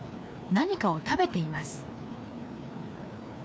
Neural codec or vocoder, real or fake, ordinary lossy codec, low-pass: codec, 16 kHz, 2 kbps, FreqCodec, larger model; fake; none; none